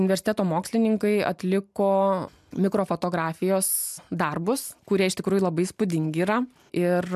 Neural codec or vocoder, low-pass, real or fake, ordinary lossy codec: none; 14.4 kHz; real; MP3, 96 kbps